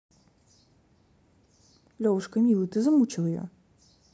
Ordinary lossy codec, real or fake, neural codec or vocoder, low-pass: none; real; none; none